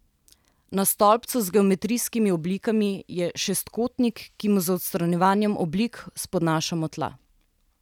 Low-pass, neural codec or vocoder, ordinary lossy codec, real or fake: 19.8 kHz; none; none; real